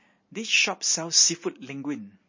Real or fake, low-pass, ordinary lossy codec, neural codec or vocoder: real; 7.2 kHz; MP3, 32 kbps; none